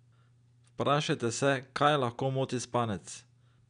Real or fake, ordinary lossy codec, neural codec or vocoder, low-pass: real; none; none; 9.9 kHz